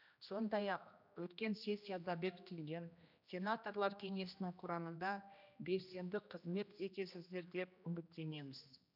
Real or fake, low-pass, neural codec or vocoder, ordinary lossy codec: fake; 5.4 kHz; codec, 16 kHz, 1 kbps, X-Codec, HuBERT features, trained on general audio; none